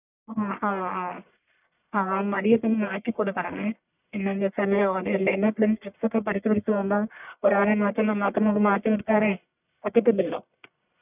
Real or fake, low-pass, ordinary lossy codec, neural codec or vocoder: fake; 3.6 kHz; none; codec, 44.1 kHz, 1.7 kbps, Pupu-Codec